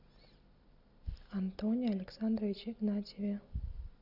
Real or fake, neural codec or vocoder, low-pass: real; none; 5.4 kHz